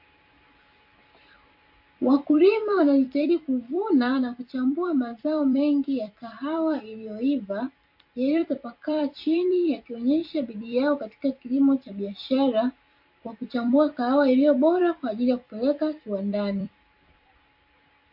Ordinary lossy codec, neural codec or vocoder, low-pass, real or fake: MP3, 32 kbps; vocoder, 44.1 kHz, 128 mel bands every 256 samples, BigVGAN v2; 5.4 kHz; fake